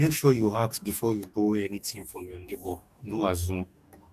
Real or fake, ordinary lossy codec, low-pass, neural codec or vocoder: fake; none; 14.4 kHz; codec, 44.1 kHz, 2.6 kbps, DAC